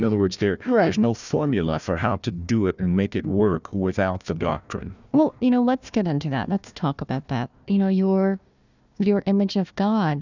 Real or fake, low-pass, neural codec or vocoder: fake; 7.2 kHz; codec, 16 kHz, 1 kbps, FunCodec, trained on Chinese and English, 50 frames a second